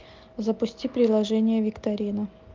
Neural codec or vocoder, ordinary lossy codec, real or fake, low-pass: none; Opus, 24 kbps; real; 7.2 kHz